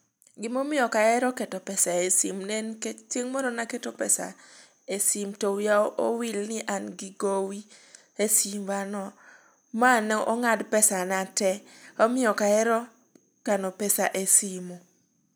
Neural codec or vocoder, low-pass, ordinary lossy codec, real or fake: none; none; none; real